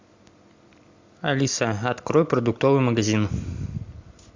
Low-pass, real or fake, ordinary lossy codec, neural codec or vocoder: 7.2 kHz; real; MP3, 64 kbps; none